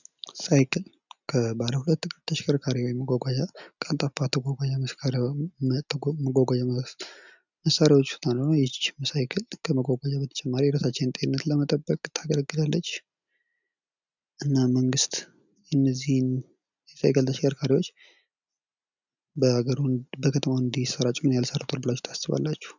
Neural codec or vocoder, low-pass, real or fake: none; 7.2 kHz; real